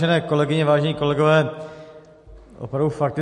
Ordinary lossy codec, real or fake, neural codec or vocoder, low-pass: MP3, 48 kbps; real; none; 14.4 kHz